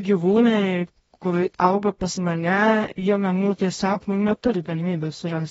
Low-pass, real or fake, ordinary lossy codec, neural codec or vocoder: 10.8 kHz; fake; AAC, 24 kbps; codec, 24 kHz, 0.9 kbps, WavTokenizer, medium music audio release